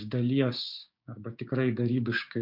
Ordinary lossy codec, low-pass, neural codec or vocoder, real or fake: MP3, 48 kbps; 5.4 kHz; codec, 16 kHz, 6 kbps, DAC; fake